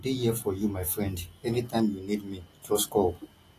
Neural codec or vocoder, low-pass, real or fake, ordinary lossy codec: none; 14.4 kHz; real; AAC, 48 kbps